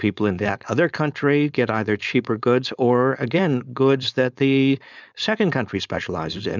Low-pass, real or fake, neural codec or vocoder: 7.2 kHz; fake; codec, 16 kHz, 4.8 kbps, FACodec